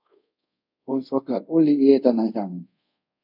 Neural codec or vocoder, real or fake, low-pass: codec, 24 kHz, 0.5 kbps, DualCodec; fake; 5.4 kHz